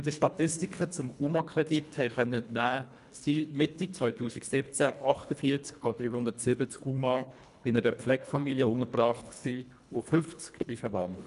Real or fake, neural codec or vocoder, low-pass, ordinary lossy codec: fake; codec, 24 kHz, 1.5 kbps, HILCodec; 10.8 kHz; none